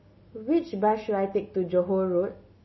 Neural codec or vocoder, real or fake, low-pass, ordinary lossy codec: none; real; 7.2 kHz; MP3, 24 kbps